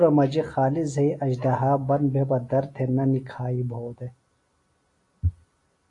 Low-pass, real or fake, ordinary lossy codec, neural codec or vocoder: 10.8 kHz; real; AAC, 48 kbps; none